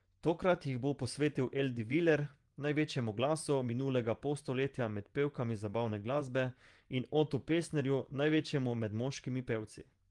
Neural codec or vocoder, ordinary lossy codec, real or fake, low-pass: vocoder, 24 kHz, 100 mel bands, Vocos; Opus, 24 kbps; fake; 10.8 kHz